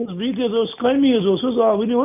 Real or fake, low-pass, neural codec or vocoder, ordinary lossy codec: real; 3.6 kHz; none; none